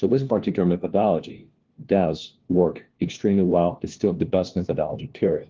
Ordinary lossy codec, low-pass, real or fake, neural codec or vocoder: Opus, 16 kbps; 7.2 kHz; fake; codec, 16 kHz, 1 kbps, FunCodec, trained on LibriTTS, 50 frames a second